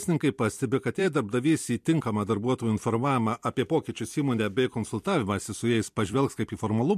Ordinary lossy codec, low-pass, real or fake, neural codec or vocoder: MP3, 64 kbps; 14.4 kHz; fake; vocoder, 44.1 kHz, 128 mel bands every 256 samples, BigVGAN v2